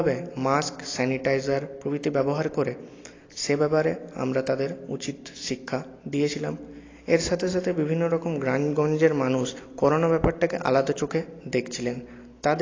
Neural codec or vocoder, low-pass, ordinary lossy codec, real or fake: none; 7.2 kHz; AAC, 32 kbps; real